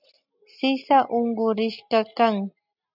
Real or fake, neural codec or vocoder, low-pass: real; none; 5.4 kHz